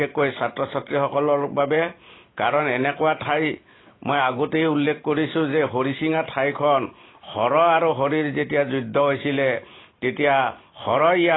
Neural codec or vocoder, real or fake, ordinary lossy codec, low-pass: none; real; AAC, 16 kbps; 7.2 kHz